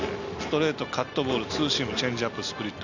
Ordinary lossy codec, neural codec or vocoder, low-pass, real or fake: none; none; 7.2 kHz; real